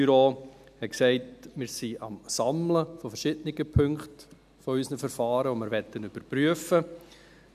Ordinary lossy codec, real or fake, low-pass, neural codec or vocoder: none; real; 14.4 kHz; none